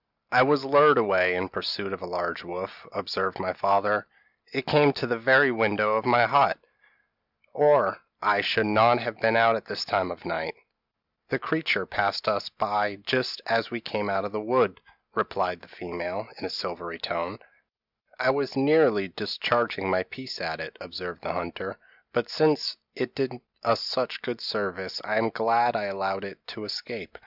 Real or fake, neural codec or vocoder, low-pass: real; none; 5.4 kHz